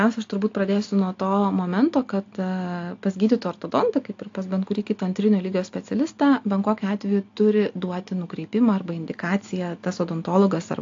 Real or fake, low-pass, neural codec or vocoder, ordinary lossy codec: real; 7.2 kHz; none; AAC, 48 kbps